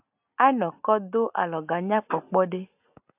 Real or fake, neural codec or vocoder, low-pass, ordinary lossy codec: real; none; 3.6 kHz; AAC, 32 kbps